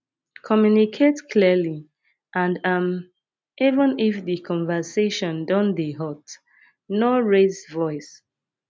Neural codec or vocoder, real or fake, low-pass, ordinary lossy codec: none; real; none; none